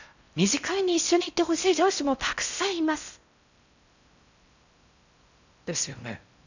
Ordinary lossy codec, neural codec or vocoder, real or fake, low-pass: none; codec, 16 kHz in and 24 kHz out, 0.6 kbps, FocalCodec, streaming, 4096 codes; fake; 7.2 kHz